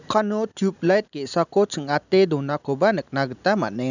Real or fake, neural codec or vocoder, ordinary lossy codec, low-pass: real; none; none; 7.2 kHz